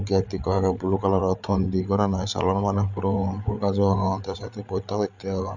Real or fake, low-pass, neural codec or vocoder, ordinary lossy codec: fake; 7.2 kHz; codec, 16 kHz, 8 kbps, FreqCodec, larger model; none